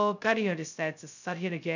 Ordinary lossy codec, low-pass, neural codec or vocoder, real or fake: none; 7.2 kHz; codec, 16 kHz, 0.2 kbps, FocalCodec; fake